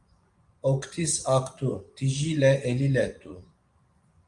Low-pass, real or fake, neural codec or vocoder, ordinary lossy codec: 10.8 kHz; fake; vocoder, 24 kHz, 100 mel bands, Vocos; Opus, 32 kbps